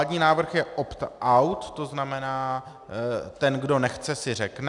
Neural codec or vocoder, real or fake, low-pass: none; real; 10.8 kHz